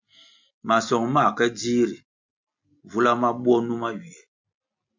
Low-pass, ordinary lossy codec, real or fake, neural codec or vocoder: 7.2 kHz; MP3, 64 kbps; real; none